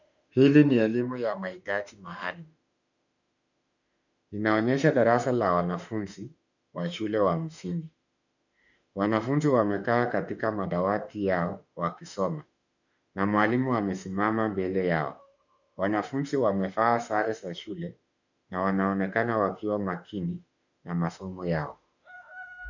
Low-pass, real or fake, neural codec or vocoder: 7.2 kHz; fake; autoencoder, 48 kHz, 32 numbers a frame, DAC-VAE, trained on Japanese speech